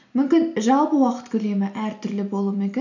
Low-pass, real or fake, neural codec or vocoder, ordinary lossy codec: 7.2 kHz; real; none; none